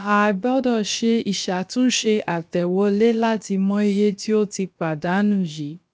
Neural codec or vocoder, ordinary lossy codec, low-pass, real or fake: codec, 16 kHz, about 1 kbps, DyCAST, with the encoder's durations; none; none; fake